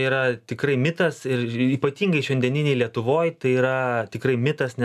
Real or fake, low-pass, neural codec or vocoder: real; 14.4 kHz; none